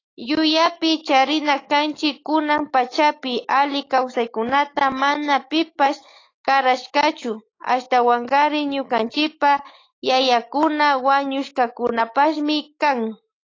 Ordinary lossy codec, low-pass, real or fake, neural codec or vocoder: AAC, 32 kbps; 7.2 kHz; fake; autoencoder, 48 kHz, 128 numbers a frame, DAC-VAE, trained on Japanese speech